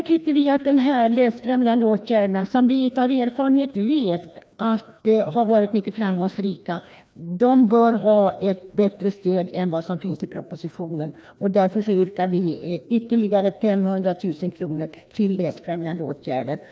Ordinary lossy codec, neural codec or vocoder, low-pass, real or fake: none; codec, 16 kHz, 1 kbps, FreqCodec, larger model; none; fake